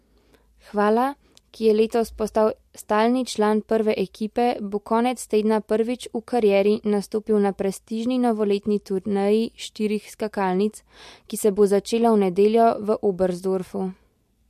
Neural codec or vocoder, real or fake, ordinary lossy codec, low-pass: none; real; MP3, 64 kbps; 14.4 kHz